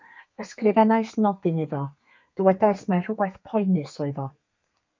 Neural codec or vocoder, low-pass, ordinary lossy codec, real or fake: codec, 32 kHz, 1.9 kbps, SNAC; 7.2 kHz; MP3, 64 kbps; fake